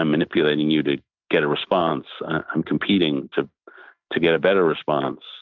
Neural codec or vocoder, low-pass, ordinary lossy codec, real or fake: none; 7.2 kHz; MP3, 64 kbps; real